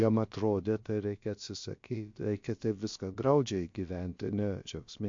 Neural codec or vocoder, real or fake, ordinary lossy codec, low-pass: codec, 16 kHz, about 1 kbps, DyCAST, with the encoder's durations; fake; MP3, 48 kbps; 7.2 kHz